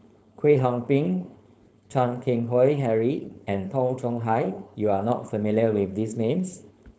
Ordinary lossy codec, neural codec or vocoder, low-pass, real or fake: none; codec, 16 kHz, 4.8 kbps, FACodec; none; fake